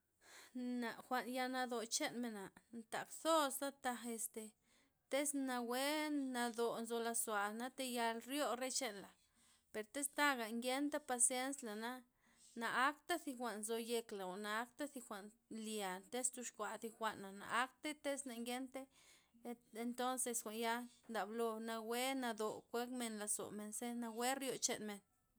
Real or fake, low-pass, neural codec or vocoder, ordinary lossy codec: real; none; none; none